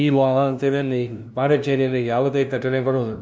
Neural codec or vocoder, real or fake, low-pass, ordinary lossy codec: codec, 16 kHz, 0.5 kbps, FunCodec, trained on LibriTTS, 25 frames a second; fake; none; none